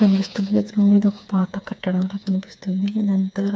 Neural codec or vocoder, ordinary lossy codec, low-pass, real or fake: codec, 16 kHz, 4 kbps, FreqCodec, smaller model; none; none; fake